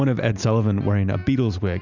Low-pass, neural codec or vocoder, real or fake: 7.2 kHz; none; real